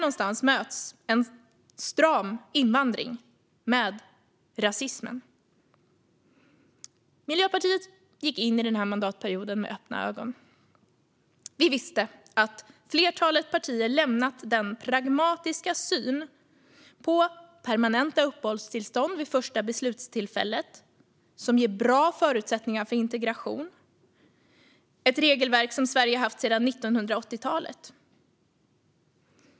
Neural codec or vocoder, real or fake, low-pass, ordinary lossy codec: none; real; none; none